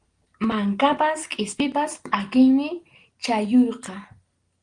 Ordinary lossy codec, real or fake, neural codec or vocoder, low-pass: Opus, 24 kbps; real; none; 9.9 kHz